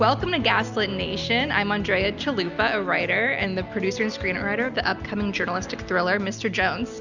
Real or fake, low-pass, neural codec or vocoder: real; 7.2 kHz; none